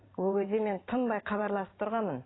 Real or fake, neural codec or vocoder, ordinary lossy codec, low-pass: fake; vocoder, 44.1 kHz, 128 mel bands every 512 samples, BigVGAN v2; AAC, 16 kbps; 7.2 kHz